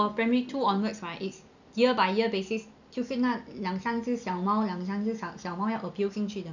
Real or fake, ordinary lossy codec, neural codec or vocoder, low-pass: real; none; none; 7.2 kHz